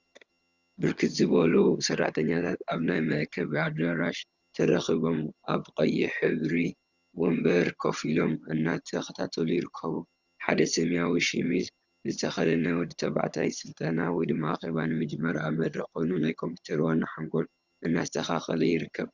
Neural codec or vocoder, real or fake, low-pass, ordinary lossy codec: vocoder, 22.05 kHz, 80 mel bands, HiFi-GAN; fake; 7.2 kHz; Opus, 64 kbps